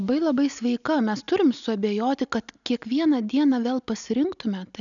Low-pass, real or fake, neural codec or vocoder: 7.2 kHz; real; none